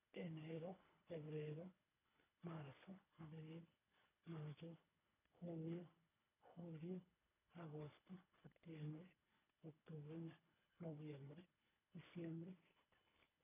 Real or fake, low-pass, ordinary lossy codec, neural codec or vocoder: fake; 3.6 kHz; none; codec, 24 kHz, 3 kbps, HILCodec